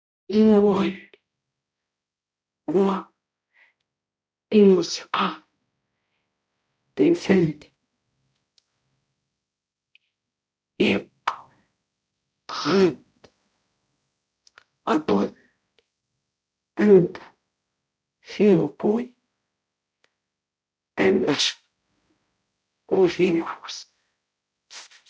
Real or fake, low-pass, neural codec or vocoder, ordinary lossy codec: fake; none; codec, 16 kHz, 0.5 kbps, X-Codec, HuBERT features, trained on balanced general audio; none